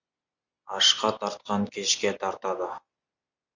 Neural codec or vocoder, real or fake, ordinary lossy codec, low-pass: none; real; MP3, 64 kbps; 7.2 kHz